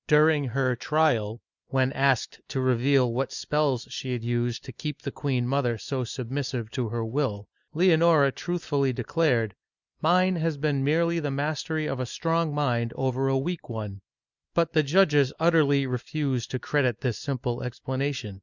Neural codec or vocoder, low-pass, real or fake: none; 7.2 kHz; real